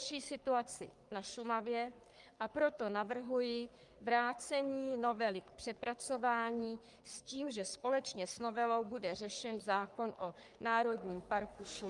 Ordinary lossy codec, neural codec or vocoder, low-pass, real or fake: Opus, 32 kbps; codec, 44.1 kHz, 3.4 kbps, Pupu-Codec; 10.8 kHz; fake